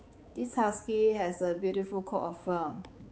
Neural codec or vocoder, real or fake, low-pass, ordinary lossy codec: codec, 16 kHz, 4 kbps, X-Codec, HuBERT features, trained on balanced general audio; fake; none; none